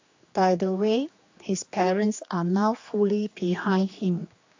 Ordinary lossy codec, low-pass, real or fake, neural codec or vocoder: AAC, 48 kbps; 7.2 kHz; fake; codec, 16 kHz, 2 kbps, X-Codec, HuBERT features, trained on general audio